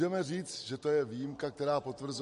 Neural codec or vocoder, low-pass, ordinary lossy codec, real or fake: none; 14.4 kHz; MP3, 48 kbps; real